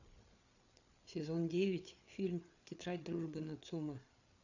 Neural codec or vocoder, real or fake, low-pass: codec, 16 kHz, 8 kbps, FreqCodec, larger model; fake; 7.2 kHz